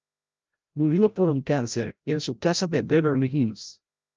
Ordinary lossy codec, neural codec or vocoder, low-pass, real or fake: Opus, 24 kbps; codec, 16 kHz, 0.5 kbps, FreqCodec, larger model; 7.2 kHz; fake